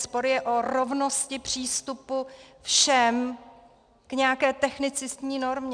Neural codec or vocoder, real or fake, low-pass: none; real; 9.9 kHz